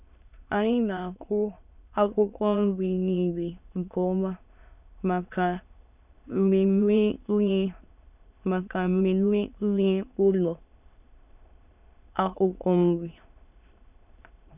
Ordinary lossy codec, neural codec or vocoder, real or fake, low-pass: none; autoencoder, 22.05 kHz, a latent of 192 numbers a frame, VITS, trained on many speakers; fake; 3.6 kHz